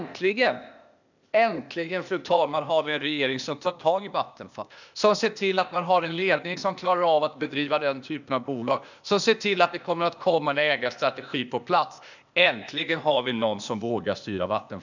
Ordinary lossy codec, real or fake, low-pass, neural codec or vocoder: none; fake; 7.2 kHz; codec, 16 kHz, 0.8 kbps, ZipCodec